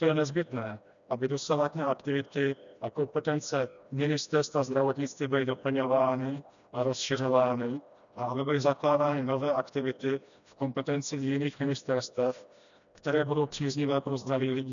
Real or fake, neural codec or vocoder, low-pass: fake; codec, 16 kHz, 1 kbps, FreqCodec, smaller model; 7.2 kHz